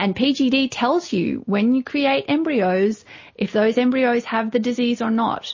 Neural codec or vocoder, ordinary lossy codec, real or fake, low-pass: none; MP3, 32 kbps; real; 7.2 kHz